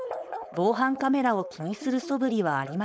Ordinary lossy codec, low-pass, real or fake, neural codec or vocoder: none; none; fake; codec, 16 kHz, 4.8 kbps, FACodec